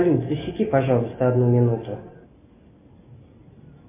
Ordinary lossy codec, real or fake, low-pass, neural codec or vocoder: MP3, 24 kbps; real; 3.6 kHz; none